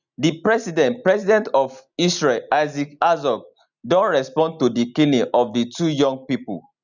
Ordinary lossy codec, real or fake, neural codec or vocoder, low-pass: none; real; none; 7.2 kHz